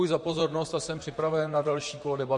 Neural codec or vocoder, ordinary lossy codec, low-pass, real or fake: vocoder, 44.1 kHz, 128 mel bands, Pupu-Vocoder; MP3, 48 kbps; 10.8 kHz; fake